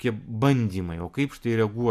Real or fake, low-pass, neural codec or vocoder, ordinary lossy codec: real; 14.4 kHz; none; MP3, 96 kbps